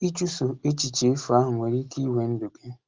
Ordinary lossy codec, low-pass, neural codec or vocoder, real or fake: Opus, 16 kbps; 7.2 kHz; none; real